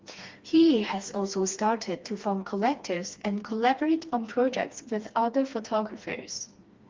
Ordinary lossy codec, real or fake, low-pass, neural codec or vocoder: Opus, 32 kbps; fake; 7.2 kHz; codec, 16 kHz, 2 kbps, FreqCodec, smaller model